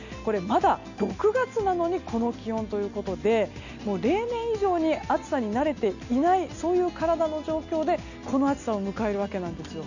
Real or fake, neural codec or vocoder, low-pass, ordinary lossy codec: real; none; 7.2 kHz; none